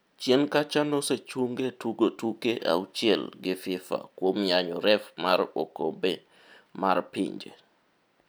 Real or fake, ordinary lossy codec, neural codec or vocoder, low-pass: real; none; none; none